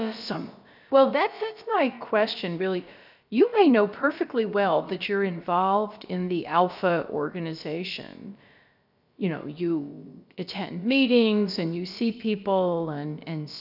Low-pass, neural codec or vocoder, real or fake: 5.4 kHz; codec, 16 kHz, about 1 kbps, DyCAST, with the encoder's durations; fake